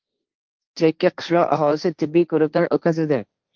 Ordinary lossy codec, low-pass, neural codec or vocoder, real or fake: Opus, 32 kbps; 7.2 kHz; codec, 16 kHz, 1.1 kbps, Voila-Tokenizer; fake